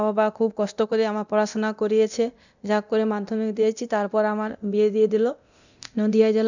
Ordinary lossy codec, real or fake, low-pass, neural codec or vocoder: none; fake; 7.2 kHz; codec, 24 kHz, 0.9 kbps, DualCodec